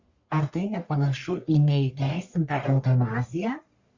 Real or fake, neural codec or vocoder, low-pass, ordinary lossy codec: fake; codec, 44.1 kHz, 1.7 kbps, Pupu-Codec; 7.2 kHz; Opus, 64 kbps